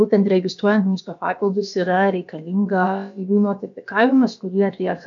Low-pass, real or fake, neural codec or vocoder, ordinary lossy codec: 7.2 kHz; fake; codec, 16 kHz, about 1 kbps, DyCAST, with the encoder's durations; MP3, 64 kbps